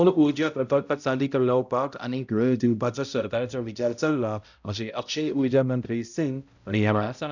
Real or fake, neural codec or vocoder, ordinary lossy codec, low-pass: fake; codec, 16 kHz, 0.5 kbps, X-Codec, HuBERT features, trained on balanced general audio; none; 7.2 kHz